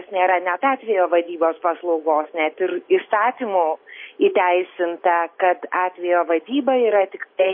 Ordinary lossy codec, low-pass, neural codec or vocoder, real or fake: MP3, 24 kbps; 5.4 kHz; none; real